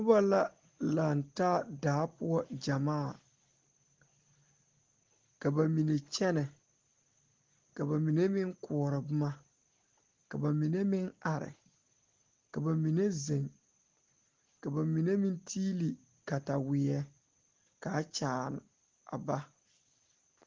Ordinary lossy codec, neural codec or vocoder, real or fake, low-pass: Opus, 16 kbps; none; real; 7.2 kHz